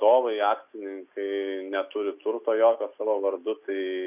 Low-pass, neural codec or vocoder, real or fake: 3.6 kHz; none; real